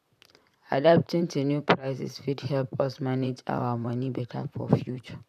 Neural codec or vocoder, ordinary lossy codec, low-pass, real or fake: vocoder, 44.1 kHz, 128 mel bands, Pupu-Vocoder; none; 14.4 kHz; fake